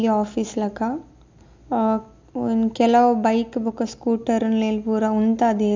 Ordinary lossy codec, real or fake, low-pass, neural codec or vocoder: MP3, 64 kbps; real; 7.2 kHz; none